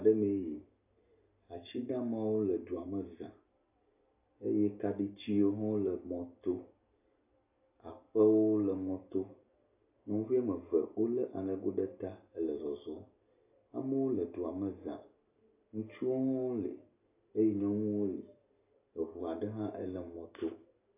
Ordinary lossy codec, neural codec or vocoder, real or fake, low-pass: AAC, 32 kbps; none; real; 3.6 kHz